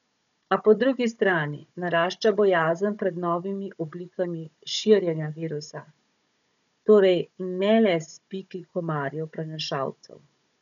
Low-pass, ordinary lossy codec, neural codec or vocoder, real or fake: 7.2 kHz; none; codec, 16 kHz, 16 kbps, FunCodec, trained on Chinese and English, 50 frames a second; fake